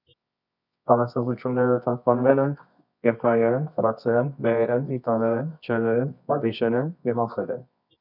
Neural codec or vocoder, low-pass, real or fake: codec, 24 kHz, 0.9 kbps, WavTokenizer, medium music audio release; 5.4 kHz; fake